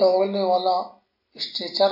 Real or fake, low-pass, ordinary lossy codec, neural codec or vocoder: real; 5.4 kHz; MP3, 32 kbps; none